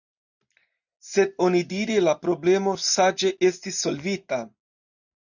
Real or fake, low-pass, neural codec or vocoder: real; 7.2 kHz; none